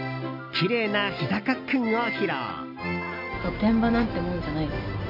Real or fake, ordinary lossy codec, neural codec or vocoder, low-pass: real; AAC, 48 kbps; none; 5.4 kHz